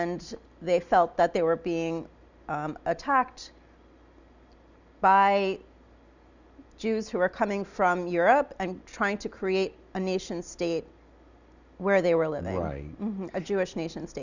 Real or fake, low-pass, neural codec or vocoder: real; 7.2 kHz; none